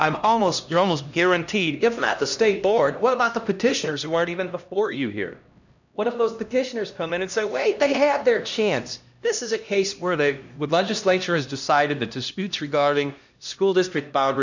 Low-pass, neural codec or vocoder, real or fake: 7.2 kHz; codec, 16 kHz, 1 kbps, X-Codec, HuBERT features, trained on LibriSpeech; fake